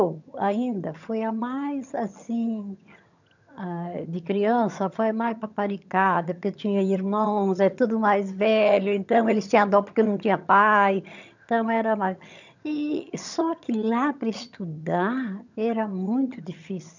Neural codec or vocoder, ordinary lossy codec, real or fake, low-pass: vocoder, 22.05 kHz, 80 mel bands, HiFi-GAN; none; fake; 7.2 kHz